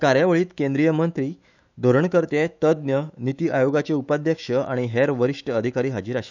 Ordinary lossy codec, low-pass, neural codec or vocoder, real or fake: none; 7.2 kHz; autoencoder, 48 kHz, 128 numbers a frame, DAC-VAE, trained on Japanese speech; fake